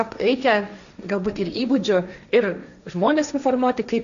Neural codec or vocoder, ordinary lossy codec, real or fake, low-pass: codec, 16 kHz, 1.1 kbps, Voila-Tokenizer; AAC, 96 kbps; fake; 7.2 kHz